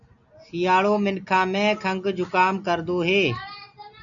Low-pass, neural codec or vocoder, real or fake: 7.2 kHz; none; real